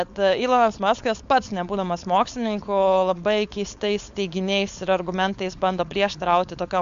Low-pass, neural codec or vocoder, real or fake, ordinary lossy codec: 7.2 kHz; codec, 16 kHz, 4.8 kbps, FACodec; fake; MP3, 64 kbps